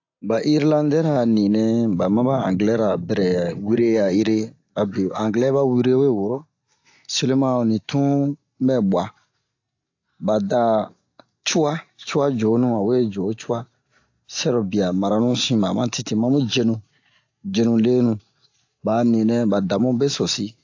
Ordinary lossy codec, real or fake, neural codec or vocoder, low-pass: AAC, 48 kbps; real; none; 7.2 kHz